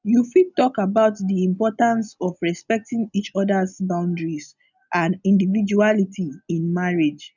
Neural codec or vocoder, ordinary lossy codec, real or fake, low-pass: none; none; real; 7.2 kHz